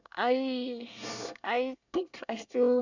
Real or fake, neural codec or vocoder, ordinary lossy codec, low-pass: fake; codec, 24 kHz, 1 kbps, SNAC; none; 7.2 kHz